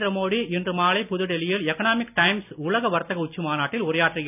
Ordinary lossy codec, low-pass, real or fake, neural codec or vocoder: none; 3.6 kHz; real; none